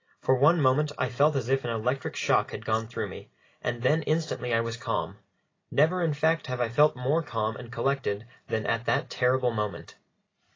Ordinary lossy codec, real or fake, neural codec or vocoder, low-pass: AAC, 32 kbps; real; none; 7.2 kHz